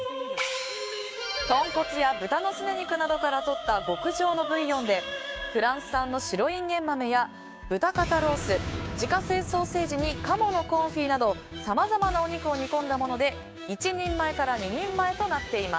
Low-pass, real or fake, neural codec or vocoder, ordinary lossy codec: none; fake; codec, 16 kHz, 6 kbps, DAC; none